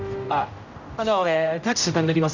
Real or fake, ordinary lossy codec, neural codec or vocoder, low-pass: fake; none; codec, 16 kHz, 0.5 kbps, X-Codec, HuBERT features, trained on general audio; 7.2 kHz